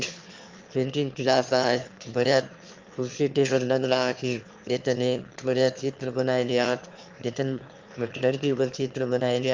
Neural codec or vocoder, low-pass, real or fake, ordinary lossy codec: autoencoder, 22.05 kHz, a latent of 192 numbers a frame, VITS, trained on one speaker; 7.2 kHz; fake; Opus, 32 kbps